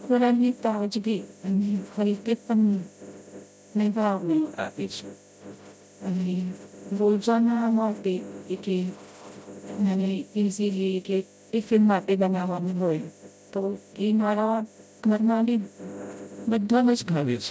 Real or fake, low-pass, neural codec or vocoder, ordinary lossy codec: fake; none; codec, 16 kHz, 0.5 kbps, FreqCodec, smaller model; none